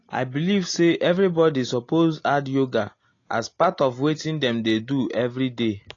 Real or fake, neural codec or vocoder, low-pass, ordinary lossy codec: real; none; 7.2 kHz; AAC, 32 kbps